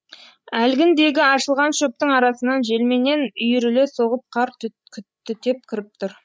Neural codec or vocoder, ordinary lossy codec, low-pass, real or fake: codec, 16 kHz, 16 kbps, FreqCodec, larger model; none; none; fake